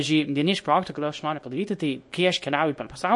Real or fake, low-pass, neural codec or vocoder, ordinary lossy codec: fake; 10.8 kHz; codec, 24 kHz, 0.9 kbps, WavTokenizer, small release; MP3, 48 kbps